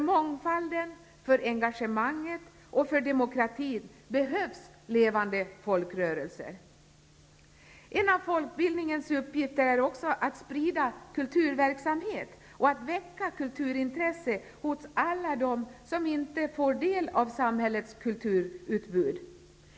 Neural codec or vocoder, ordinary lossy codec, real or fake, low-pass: none; none; real; none